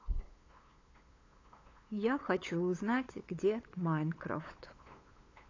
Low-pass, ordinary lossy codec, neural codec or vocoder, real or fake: 7.2 kHz; AAC, 32 kbps; codec, 16 kHz, 8 kbps, FunCodec, trained on LibriTTS, 25 frames a second; fake